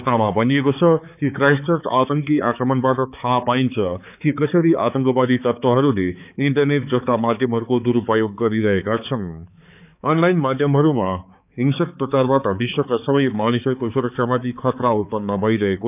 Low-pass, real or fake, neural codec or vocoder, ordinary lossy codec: 3.6 kHz; fake; codec, 16 kHz, 4 kbps, X-Codec, HuBERT features, trained on balanced general audio; none